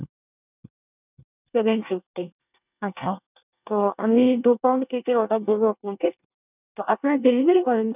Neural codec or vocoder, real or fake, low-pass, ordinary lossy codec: codec, 24 kHz, 1 kbps, SNAC; fake; 3.6 kHz; none